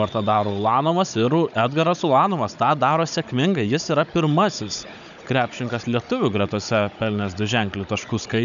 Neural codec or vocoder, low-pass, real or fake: codec, 16 kHz, 16 kbps, FunCodec, trained on Chinese and English, 50 frames a second; 7.2 kHz; fake